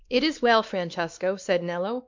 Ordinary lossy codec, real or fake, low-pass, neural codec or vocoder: MP3, 64 kbps; fake; 7.2 kHz; codec, 16 kHz, 2 kbps, X-Codec, WavLM features, trained on Multilingual LibriSpeech